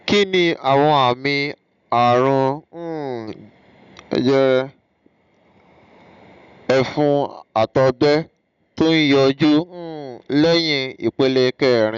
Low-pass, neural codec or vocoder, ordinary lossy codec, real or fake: 7.2 kHz; none; none; real